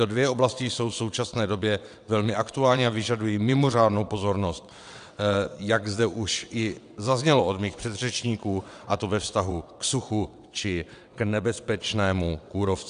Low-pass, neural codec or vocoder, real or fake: 9.9 kHz; vocoder, 22.05 kHz, 80 mel bands, WaveNeXt; fake